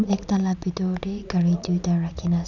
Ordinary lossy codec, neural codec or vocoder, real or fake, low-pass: none; vocoder, 44.1 kHz, 80 mel bands, Vocos; fake; 7.2 kHz